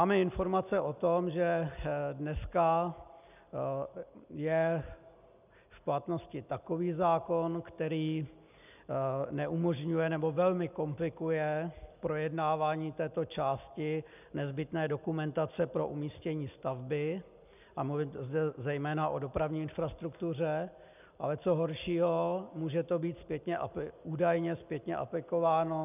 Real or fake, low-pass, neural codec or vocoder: real; 3.6 kHz; none